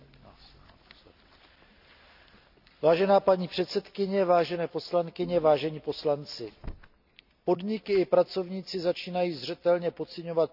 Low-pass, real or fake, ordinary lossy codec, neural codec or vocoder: 5.4 kHz; real; none; none